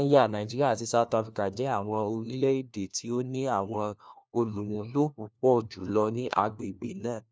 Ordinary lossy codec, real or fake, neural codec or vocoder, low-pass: none; fake; codec, 16 kHz, 1 kbps, FunCodec, trained on LibriTTS, 50 frames a second; none